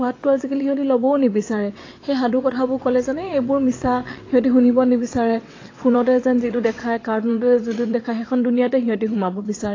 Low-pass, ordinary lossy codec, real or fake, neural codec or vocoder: 7.2 kHz; AAC, 32 kbps; fake; vocoder, 22.05 kHz, 80 mel bands, WaveNeXt